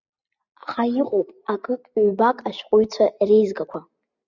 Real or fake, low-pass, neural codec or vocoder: real; 7.2 kHz; none